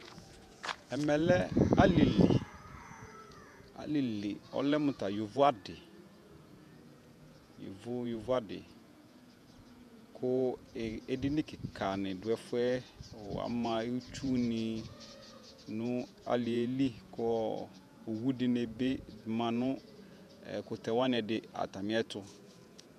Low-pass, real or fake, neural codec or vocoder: 14.4 kHz; fake; vocoder, 48 kHz, 128 mel bands, Vocos